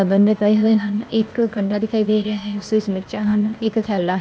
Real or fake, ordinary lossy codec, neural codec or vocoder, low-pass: fake; none; codec, 16 kHz, 0.8 kbps, ZipCodec; none